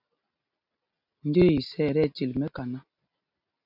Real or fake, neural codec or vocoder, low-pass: real; none; 5.4 kHz